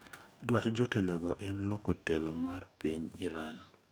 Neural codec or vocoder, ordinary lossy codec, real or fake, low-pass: codec, 44.1 kHz, 2.6 kbps, DAC; none; fake; none